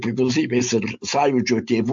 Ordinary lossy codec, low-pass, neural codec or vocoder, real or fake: MP3, 48 kbps; 7.2 kHz; none; real